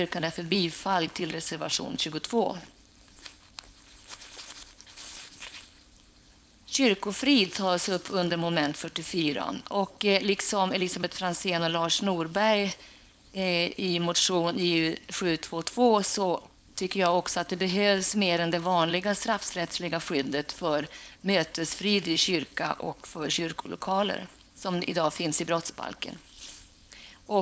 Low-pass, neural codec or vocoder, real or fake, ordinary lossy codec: none; codec, 16 kHz, 4.8 kbps, FACodec; fake; none